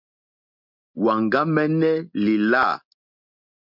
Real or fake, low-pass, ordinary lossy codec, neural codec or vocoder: real; 5.4 kHz; MP3, 48 kbps; none